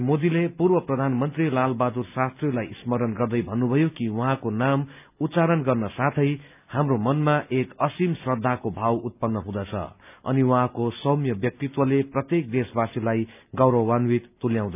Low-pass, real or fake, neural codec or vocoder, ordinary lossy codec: 3.6 kHz; real; none; none